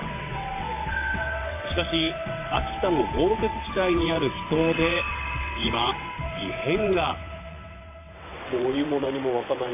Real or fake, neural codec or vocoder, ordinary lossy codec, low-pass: fake; vocoder, 22.05 kHz, 80 mel bands, WaveNeXt; AAC, 24 kbps; 3.6 kHz